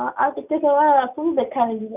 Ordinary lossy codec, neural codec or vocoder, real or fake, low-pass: none; none; real; 3.6 kHz